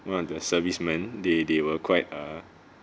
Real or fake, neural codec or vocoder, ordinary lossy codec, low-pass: real; none; none; none